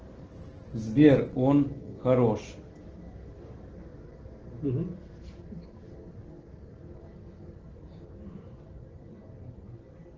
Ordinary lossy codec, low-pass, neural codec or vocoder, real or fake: Opus, 16 kbps; 7.2 kHz; none; real